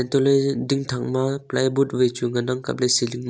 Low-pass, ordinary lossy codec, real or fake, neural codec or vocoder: none; none; real; none